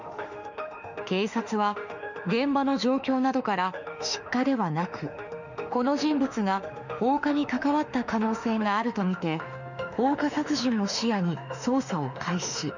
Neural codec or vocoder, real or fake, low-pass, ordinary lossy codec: autoencoder, 48 kHz, 32 numbers a frame, DAC-VAE, trained on Japanese speech; fake; 7.2 kHz; none